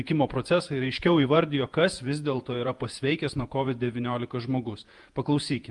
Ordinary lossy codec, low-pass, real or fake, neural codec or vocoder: Opus, 32 kbps; 10.8 kHz; fake; vocoder, 48 kHz, 128 mel bands, Vocos